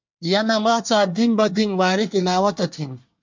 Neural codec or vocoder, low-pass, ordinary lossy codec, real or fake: codec, 24 kHz, 1 kbps, SNAC; 7.2 kHz; MP3, 64 kbps; fake